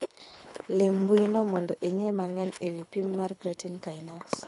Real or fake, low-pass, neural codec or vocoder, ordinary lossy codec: fake; 10.8 kHz; codec, 24 kHz, 3 kbps, HILCodec; MP3, 96 kbps